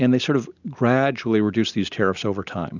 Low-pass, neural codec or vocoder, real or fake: 7.2 kHz; none; real